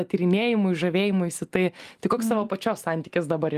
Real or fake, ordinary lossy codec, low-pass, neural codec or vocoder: real; Opus, 32 kbps; 14.4 kHz; none